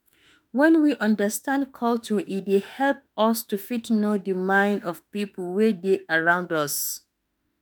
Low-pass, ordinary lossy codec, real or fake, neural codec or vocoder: none; none; fake; autoencoder, 48 kHz, 32 numbers a frame, DAC-VAE, trained on Japanese speech